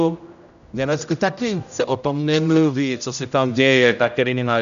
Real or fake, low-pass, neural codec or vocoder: fake; 7.2 kHz; codec, 16 kHz, 0.5 kbps, X-Codec, HuBERT features, trained on general audio